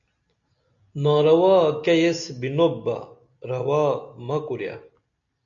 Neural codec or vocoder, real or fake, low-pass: none; real; 7.2 kHz